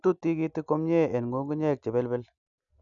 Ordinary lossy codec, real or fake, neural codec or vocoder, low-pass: none; real; none; 7.2 kHz